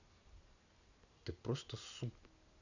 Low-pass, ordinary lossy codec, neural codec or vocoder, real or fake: 7.2 kHz; none; none; real